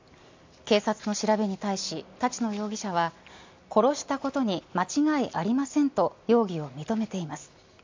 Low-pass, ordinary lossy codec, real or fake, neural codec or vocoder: 7.2 kHz; MP3, 64 kbps; real; none